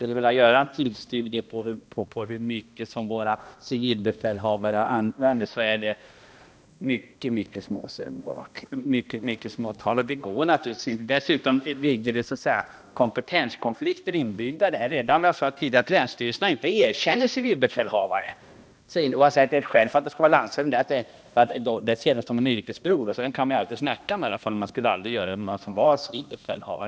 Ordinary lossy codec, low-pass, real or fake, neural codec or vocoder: none; none; fake; codec, 16 kHz, 1 kbps, X-Codec, HuBERT features, trained on balanced general audio